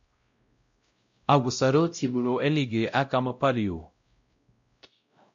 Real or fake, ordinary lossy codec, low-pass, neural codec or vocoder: fake; MP3, 48 kbps; 7.2 kHz; codec, 16 kHz, 0.5 kbps, X-Codec, WavLM features, trained on Multilingual LibriSpeech